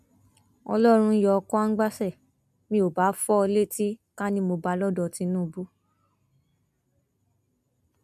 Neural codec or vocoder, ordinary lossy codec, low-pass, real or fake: none; none; 14.4 kHz; real